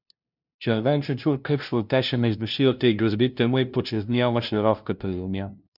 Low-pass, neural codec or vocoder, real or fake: 5.4 kHz; codec, 16 kHz, 0.5 kbps, FunCodec, trained on LibriTTS, 25 frames a second; fake